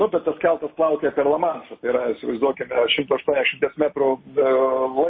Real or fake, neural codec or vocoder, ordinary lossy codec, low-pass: real; none; MP3, 24 kbps; 7.2 kHz